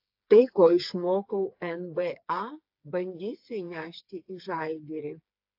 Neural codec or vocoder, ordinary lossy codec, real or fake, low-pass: codec, 16 kHz, 4 kbps, FreqCodec, smaller model; AAC, 48 kbps; fake; 5.4 kHz